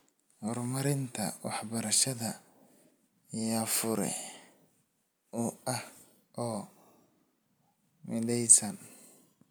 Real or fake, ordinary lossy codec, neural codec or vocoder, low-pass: real; none; none; none